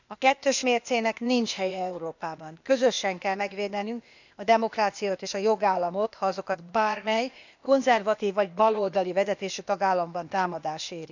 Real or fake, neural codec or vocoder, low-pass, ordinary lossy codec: fake; codec, 16 kHz, 0.8 kbps, ZipCodec; 7.2 kHz; none